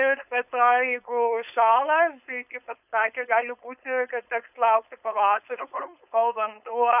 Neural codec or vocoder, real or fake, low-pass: codec, 16 kHz, 4.8 kbps, FACodec; fake; 3.6 kHz